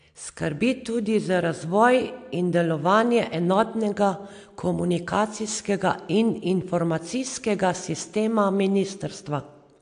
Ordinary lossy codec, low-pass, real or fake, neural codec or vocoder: AAC, 64 kbps; 9.9 kHz; real; none